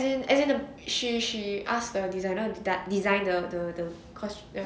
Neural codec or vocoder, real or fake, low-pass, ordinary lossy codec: none; real; none; none